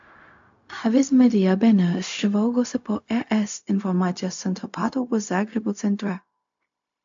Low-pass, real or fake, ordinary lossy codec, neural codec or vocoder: 7.2 kHz; fake; AAC, 64 kbps; codec, 16 kHz, 0.4 kbps, LongCat-Audio-Codec